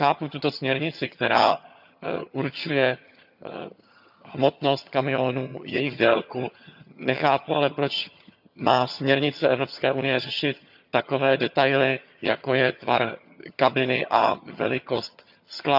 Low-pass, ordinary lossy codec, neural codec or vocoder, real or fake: 5.4 kHz; none; vocoder, 22.05 kHz, 80 mel bands, HiFi-GAN; fake